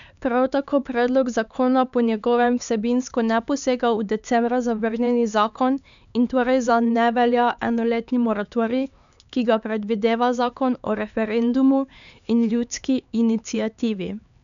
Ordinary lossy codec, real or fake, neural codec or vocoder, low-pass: none; fake; codec, 16 kHz, 4 kbps, X-Codec, HuBERT features, trained on LibriSpeech; 7.2 kHz